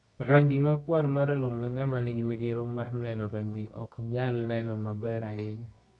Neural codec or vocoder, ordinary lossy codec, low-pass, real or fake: codec, 24 kHz, 0.9 kbps, WavTokenizer, medium music audio release; MP3, 64 kbps; 10.8 kHz; fake